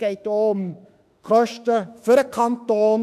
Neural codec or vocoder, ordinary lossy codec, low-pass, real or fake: autoencoder, 48 kHz, 32 numbers a frame, DAC-VAE, trained on Japanese speech; none; 14.4 kHz; fake